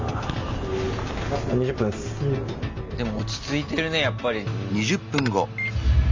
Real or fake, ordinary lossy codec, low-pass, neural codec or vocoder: real; MP3, 64 kbps; 7.2 kHz; none